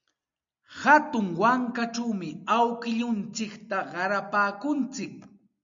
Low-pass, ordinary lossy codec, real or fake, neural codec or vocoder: 7.2 kHz; MP3, 64 kbps; real; none